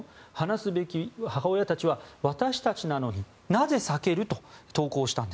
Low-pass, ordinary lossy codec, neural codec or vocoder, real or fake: none; none; none; real